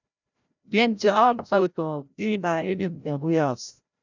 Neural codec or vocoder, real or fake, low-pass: codec, 16 kHz, 0.5 kbps, FreqCodec, larger model; fake; 7.2 kHz